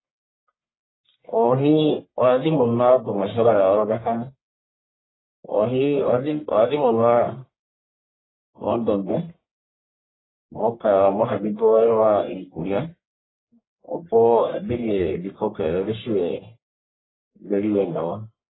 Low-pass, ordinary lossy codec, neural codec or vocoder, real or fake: 7.2 kHz; AAC, 16 kbps; codec, 44.1 kHz, 1.7 kbps, Pupu-Codec; fake